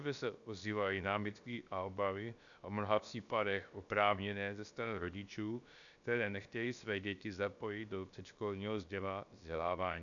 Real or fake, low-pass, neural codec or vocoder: fake; 7.2 kHz; codec, 16 kHz, about 1 kbps, DyCAST, with the encoder's durations